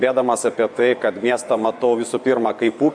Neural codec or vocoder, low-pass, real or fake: vocoder, 24 kHz, 100 mel bands, Vocos; 9.9 kHz; fake